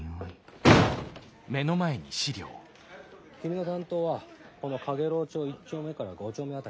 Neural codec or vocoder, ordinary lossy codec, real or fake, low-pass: none; none; real; none